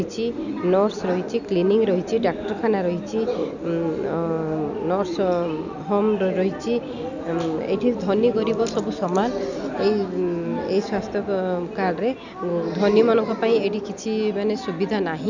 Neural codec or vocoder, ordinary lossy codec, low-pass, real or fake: none; none; 7.2 kHz; real